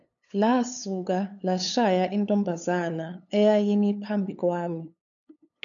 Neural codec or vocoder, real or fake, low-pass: codec, 16 kHz, 8 kbps, FunCodec, trained on LibriTTS, 25 frames a second; fake; 7.2 kHz